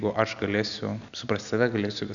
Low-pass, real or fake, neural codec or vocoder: 7.2 kHz; real; none